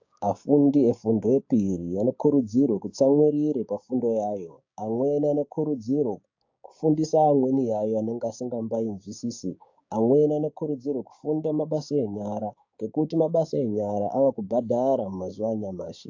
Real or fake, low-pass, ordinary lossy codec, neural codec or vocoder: fake; 7.2 kHz; AAC, 48 kbps; codec, 16 kHz, 6 kbps, DAC